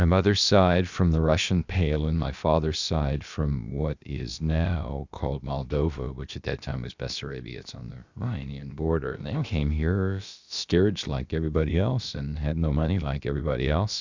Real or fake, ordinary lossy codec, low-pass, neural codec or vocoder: fake; Opus, 64 kbps; 7.2 kHz; codec, 16 kHz, about 1 kbps, DyCAST, with the encoder's durations